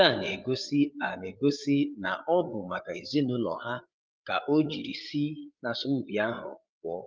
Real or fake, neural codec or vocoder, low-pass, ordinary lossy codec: fake; codec, 16 kHz, 8 kbps, FreqCodec, larger model; 7.2 kHz; Opus, 32 kbps